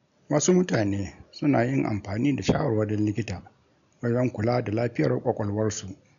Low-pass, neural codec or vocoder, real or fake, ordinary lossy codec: 7.2 kHz; none; real; none